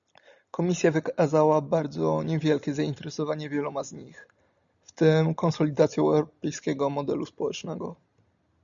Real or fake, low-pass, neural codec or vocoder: real; 7.2 kHz; none